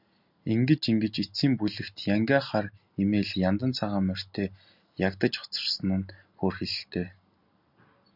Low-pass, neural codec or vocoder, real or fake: 5.4 kHz; none; real